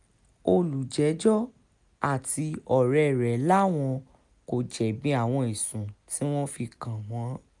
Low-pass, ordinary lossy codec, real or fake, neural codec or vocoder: 10.8 kHz; none; real; none